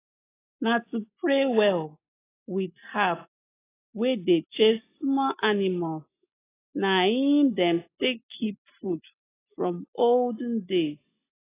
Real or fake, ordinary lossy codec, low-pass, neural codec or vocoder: real; AAC, 16 kbps; 3.6 kHz; none